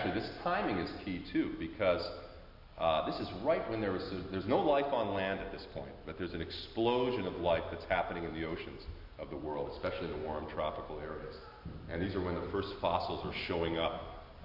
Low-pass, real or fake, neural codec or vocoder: 5.4 kHz; real; none